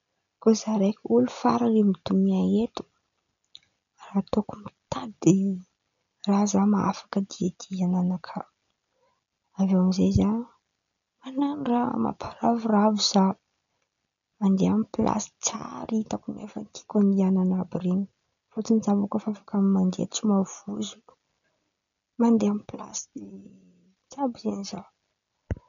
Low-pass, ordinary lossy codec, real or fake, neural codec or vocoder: 7.2 kHz; MP3, 96 kbps; real; none